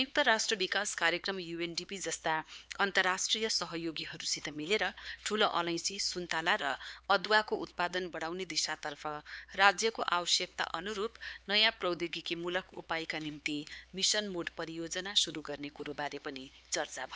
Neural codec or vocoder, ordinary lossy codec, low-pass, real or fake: codec, 16 kHz, 4 kbps, X-Codec, HuBERT features, trained on LibriSpeech; none; none; fake